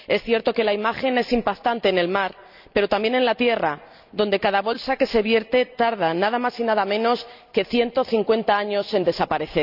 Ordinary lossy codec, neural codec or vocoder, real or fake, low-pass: none; none; real; 5.4 kHz